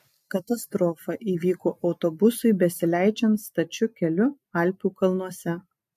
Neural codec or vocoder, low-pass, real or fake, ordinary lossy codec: none; 14.4 kHz; real; MP3, 64 kbps